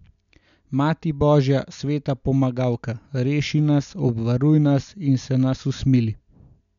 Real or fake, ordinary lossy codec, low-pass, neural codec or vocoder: real; none; 7.2 kHz; none